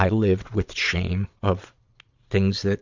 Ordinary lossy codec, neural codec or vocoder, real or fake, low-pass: Opus, 64 kbps; vocoder, 22.05 kHz, 80 mel bands, Vocos; fake; 7.2 kHz